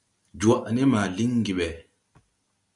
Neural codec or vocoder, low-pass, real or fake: none; 10.8 kHz; real